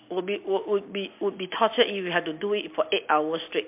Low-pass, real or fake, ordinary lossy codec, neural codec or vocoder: 3.6 kHz; real; MP3, 32 kbps; none